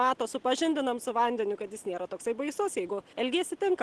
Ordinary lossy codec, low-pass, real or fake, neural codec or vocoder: Opus, 16 kbps; 10.8 kHz; real; none